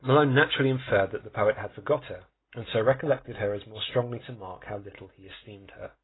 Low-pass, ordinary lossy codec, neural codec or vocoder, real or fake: 7.2 kHz; AAC, 16 kbps; none; real